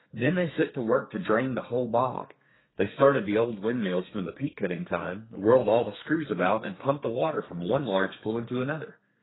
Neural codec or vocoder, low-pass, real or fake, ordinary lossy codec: codec, 32 kHz, 1.9 kbps, SNAC; 7.2 kHz; fake; AAC, 16 kbps